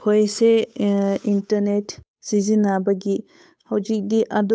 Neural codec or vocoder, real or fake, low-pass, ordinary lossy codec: codec, 16 kHz, 8 kbps, FunCodec, trained on Chinese and English, 25 frames a second; fake; none; none